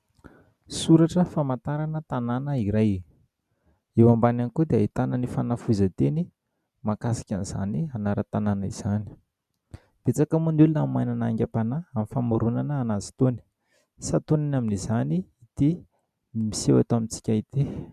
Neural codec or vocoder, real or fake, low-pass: none; real; 14.4 kHz